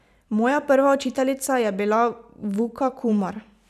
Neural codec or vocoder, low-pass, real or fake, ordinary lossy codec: vocoder, 44.1 kHz, 128 mel bands every 512 samples, BigVGAN v2; 14.4 kHz; fake; none